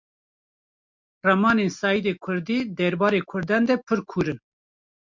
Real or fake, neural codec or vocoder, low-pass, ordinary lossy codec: real; none; 7.2 kHz; MP3, 64 kbps